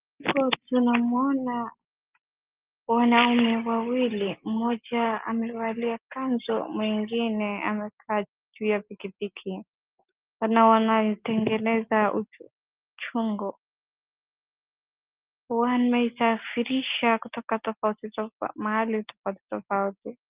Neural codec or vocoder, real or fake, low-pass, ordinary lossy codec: none; real; 3.6 kHz; Opus, 32 kbps